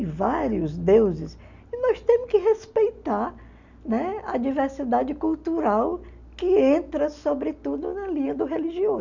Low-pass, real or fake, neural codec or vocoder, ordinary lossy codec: 7.2 kHz; real; none; none